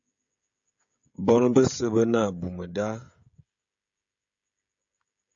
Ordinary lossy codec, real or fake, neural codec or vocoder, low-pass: AAC, 64 kbps; fake; codec, 16 kHz, 16 kbps, FreqCodec, smaller model; 7.2 kHz